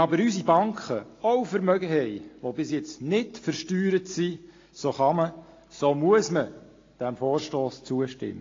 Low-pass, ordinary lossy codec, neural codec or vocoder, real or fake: 7.2 kHz; AAC, 32 kbps; none; real